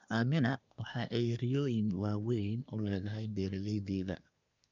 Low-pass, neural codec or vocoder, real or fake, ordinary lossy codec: 7.2 kHz; codec, 24 kHz, 1 kbps, SNAC; fake; none